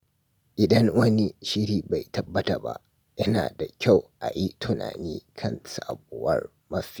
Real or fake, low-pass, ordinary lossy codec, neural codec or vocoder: real; none; none; none